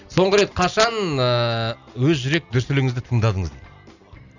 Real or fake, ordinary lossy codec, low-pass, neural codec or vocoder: real; none; 7.2 kHz; none